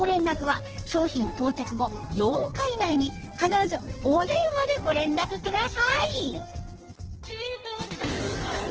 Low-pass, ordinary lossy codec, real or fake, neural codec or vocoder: 7.2 kHz; Opus, 16 kbps; fake; codec, 16 kHz in and 24 kHz out, 1.1 kbps, FireRedTTS-2 codec